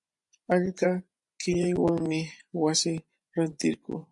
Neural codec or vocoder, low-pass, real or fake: vocoder, 24 kHz, 100 mel bands, Vocos; 10.8 kHz; fake